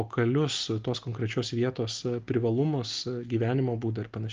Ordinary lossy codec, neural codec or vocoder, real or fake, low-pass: Opus, 32 kbps; none; real; 7.2 kHz